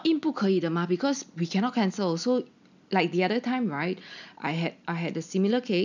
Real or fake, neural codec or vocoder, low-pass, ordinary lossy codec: real; none; 7.2 kHz; none